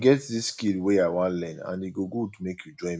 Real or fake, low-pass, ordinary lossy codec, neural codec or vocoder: real; none; none; none